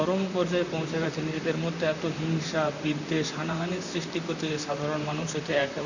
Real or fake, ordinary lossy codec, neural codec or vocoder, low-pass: fake; none; vocoder, 44.1 kHz, 128 mel bands, Pupu-Vocoder; 7.2 kHz